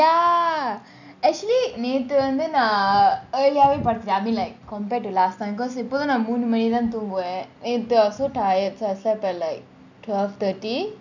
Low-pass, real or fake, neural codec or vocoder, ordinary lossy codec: 7.2 kHz; real; none; none